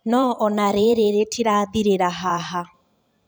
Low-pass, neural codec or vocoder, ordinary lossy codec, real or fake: none; vocoder, 44.1 kHz, 128 mel bands every 512 samples, BigVGAN v2; none; fake